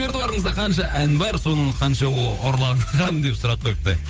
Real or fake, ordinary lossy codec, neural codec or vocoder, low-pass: fake; none; codec, 16 kHz, 8 kbps, FunCodec, trained on Chinese and English, 25 frames a second; none